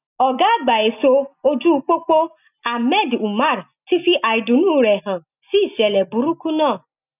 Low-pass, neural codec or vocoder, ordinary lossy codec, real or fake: 3.6 kHz; none; none; real